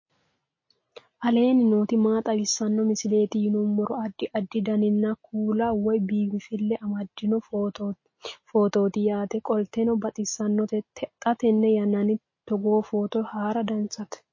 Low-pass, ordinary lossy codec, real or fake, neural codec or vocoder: 7.2 kHz; MP3, 32 kbps; real; none